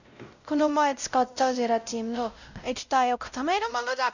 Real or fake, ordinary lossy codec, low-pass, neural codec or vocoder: fake; none; 7.2 kHz; codec, 16 kHz, 0.5 kbps, X-Codec, WavLM features, trained on Multilingual LibriSpeech